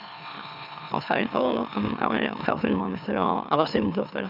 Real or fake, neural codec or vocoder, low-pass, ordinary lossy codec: fake; autoencoder, 44.1 kHz, a latent of 192 numbers a frame, MeloTTS; 5.4 kHz; none